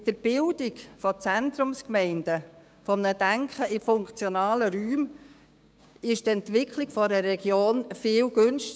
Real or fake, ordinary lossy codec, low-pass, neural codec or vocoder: fake; none; none; codec, 16 kHz, 6 kbps, DAC